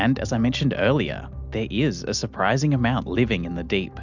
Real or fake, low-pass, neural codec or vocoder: real; 7.2 kHz; none